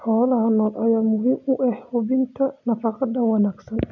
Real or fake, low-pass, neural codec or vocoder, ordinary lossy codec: real; 7.2 kHz; none; none